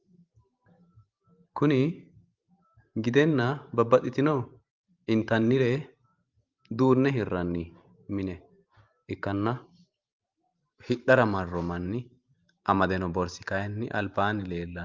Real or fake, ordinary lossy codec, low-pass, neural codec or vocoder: fake; Opus, 32 kbps; 7.2 kHz; vocoder, 44.1 kHz, 128 mel bands every 512 samples, BigVGAN v2